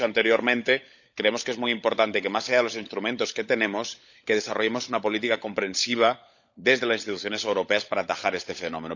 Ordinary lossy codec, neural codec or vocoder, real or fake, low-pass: none; codec, 16 kHz, 16 kbps, FunCodec, trained on LibriTTS, 50 frames a second; fake; 7.2 kHz